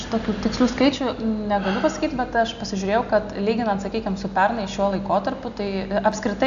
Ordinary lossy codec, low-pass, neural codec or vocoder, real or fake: AAC, 96 kbps; 7.2 kHz; none; real